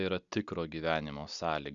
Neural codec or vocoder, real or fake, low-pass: none; real; 7.2 kHz